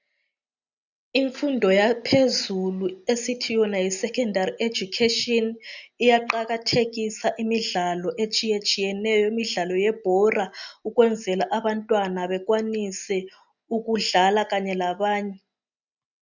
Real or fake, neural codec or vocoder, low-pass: real; none; 7.2 kHz